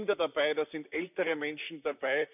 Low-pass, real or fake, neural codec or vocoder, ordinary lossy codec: 3.6 kHz; fake; vocoder, 44.1 kHz, 128 mel bands, Pupu-Vocoder; none